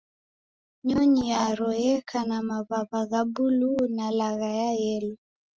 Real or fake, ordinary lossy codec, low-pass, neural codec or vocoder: real; Opus, 24 kbps; 7.2 kHz; none